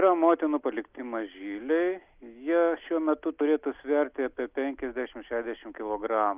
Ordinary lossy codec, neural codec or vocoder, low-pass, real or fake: Opus, 24 kbps; none; 3.6 kHz; real